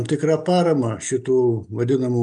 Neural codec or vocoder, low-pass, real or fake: none; 9.9 kHz; real